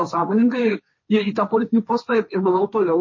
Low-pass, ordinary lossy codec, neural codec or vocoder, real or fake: 7.2 kHz; MP3, 32 kbps; codec, 16 kHz, 1.1 kbps, Voila-Tokenizer; fake